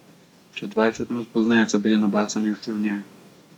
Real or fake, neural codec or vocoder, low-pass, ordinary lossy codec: fake; codec, 44.1 kHz, 2.6 kbps, DAC; 19.8 kHz; none